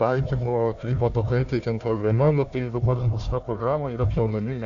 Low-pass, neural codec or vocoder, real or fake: 7.2 kHz; codec, 16 kHz, 1 kbps, FunCodec, trained on Chinese and English, 50 frames a second; fake